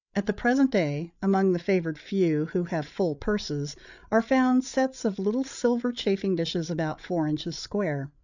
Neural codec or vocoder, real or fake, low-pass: codec, 16 kHz, 8 kbps, FreqCodec, larger model; fake; 7.2 kHz